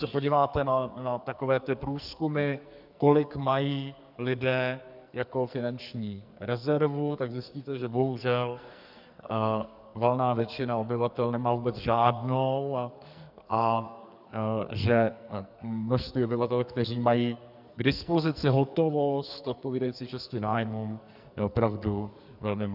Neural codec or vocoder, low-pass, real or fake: codec, 44.1 kHz, 2.6 kbps, SNAC; 5.4 kHz; fake